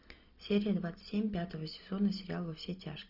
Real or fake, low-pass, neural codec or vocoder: real; 5.4 kHz; none